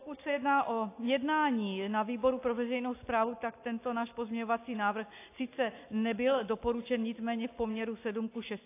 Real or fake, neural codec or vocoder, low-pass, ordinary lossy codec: real; none; 3.6 kHz; AAC, 24 kbps